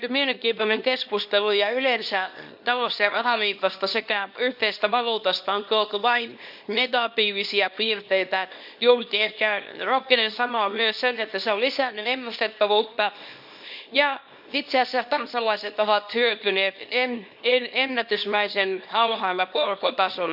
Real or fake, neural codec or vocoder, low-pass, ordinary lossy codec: fake; codec, 24 kHz, 0.9 kbps, WavTokenizer, small release; 5.4 kHz; none